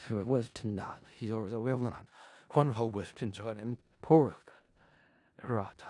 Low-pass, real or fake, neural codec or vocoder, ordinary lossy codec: 10.8 kHz; fake; codec, 16 kHz in and 24 kHz out, 0.4 kbps, LongCat-Audio-Codec, four codebook decoder; none